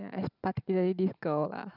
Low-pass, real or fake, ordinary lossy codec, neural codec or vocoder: 5.4 kHz; fake; none; vocoder, 44.1 kHz, 128 mel bands every 512 samples, BigVGAN v2